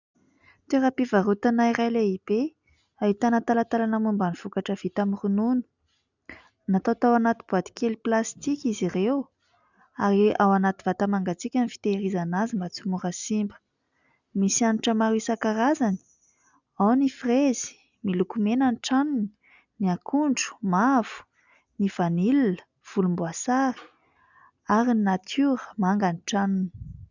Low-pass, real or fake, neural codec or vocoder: 7.2 kHz; real; none